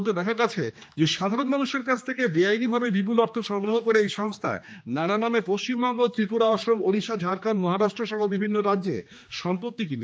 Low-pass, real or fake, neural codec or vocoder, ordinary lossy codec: none; fake; codec, 16 kHz, 2 kbps, X-Codec, HuBERT features, trained on general audio; none